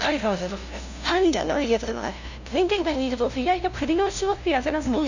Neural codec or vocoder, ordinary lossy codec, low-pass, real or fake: codec, 16 kHz, 0.5 kbps, FunCodec, trained on LibriTTS, 25 frames a second; none; 7.2 kHz; fake